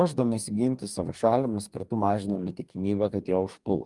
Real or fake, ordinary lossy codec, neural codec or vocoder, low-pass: fake; Opus, 32 kbps; codec, 44.1 kHz, 2.6 kbps, DAC; 10.8 kHz